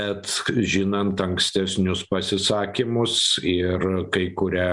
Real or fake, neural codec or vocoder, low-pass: real; none; 10.8 kHz